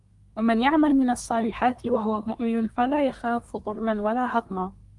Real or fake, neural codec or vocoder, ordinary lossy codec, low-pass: fake; codec, 24 kHz, 1 kbps, SNAC; Opus, 32 kbps; 10.8 kHz